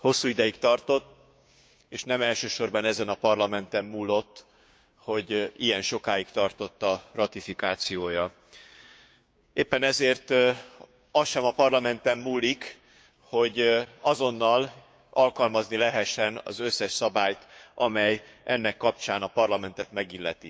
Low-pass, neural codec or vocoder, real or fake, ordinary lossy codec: none; codec, 16 kHz, 6 kbps, DAC; fake; none